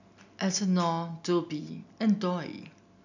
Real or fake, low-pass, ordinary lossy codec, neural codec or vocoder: real; 7.2 kHz; none; none